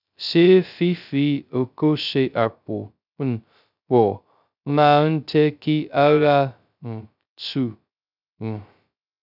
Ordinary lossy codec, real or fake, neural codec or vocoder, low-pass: none; fake; codec, 16 kHz, 0.2 kbps, FocalCodec; 5.4 kHz